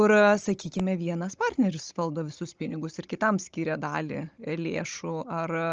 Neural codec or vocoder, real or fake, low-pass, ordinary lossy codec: codec, 16 kHz, 16 kbps, FunCodec, trained on Chinese and English, 50 frames a second; fake; 7.2 kHz; Opus, 24 kbps